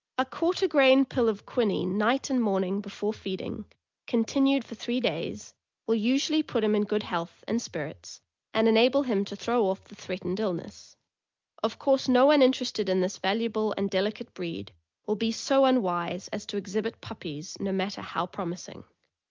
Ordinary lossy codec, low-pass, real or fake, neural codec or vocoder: Opus, 24 kbps; 7.2 kHz; real; none